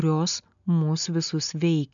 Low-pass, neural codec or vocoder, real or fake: 7.2 kHz; none; real